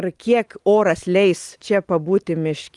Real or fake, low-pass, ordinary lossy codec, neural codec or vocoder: real; 10.8 kHz; Opus, 24 kbps; none